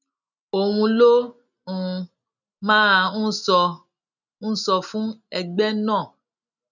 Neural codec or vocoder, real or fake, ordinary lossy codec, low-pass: none; real; none; 7.2 kHz